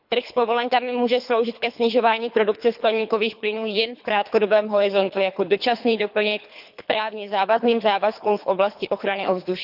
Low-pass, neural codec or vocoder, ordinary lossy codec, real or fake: 5.4 kHz; codec, 24 kHz, 3 kbps, HILCodec; none; fake